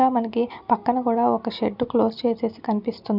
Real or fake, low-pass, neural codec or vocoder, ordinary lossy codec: real; 5.4 kHz; none; none